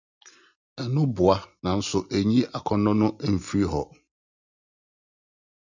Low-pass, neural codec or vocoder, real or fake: 7.2 kHz; none; real